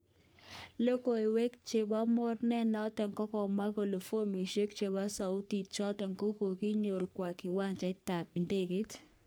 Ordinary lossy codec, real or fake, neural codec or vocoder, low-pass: none; fake; codec, 44.1 kHz, 3.4 kbps, Pupu-Codec; none